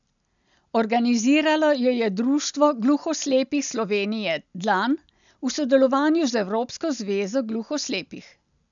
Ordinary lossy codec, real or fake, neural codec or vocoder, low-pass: none; real; none; 7.2 kHz